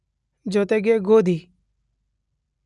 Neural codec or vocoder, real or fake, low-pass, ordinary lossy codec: none; real; 10.8 kHz; none